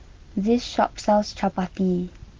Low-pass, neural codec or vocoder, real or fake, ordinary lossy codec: 7.2 kHz; none; real; Opus, 16 kbps